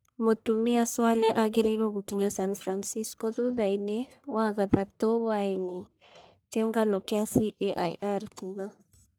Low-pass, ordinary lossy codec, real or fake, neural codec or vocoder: none; none; fake; codec, 44.1 kHz, 1.7 kbps, Pupu-Codec